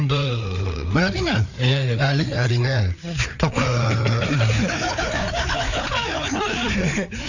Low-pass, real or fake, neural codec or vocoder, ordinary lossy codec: 7.2 kHz; fake; codec, 16 kHz, 4 kbps, FreqCodec, larger model; none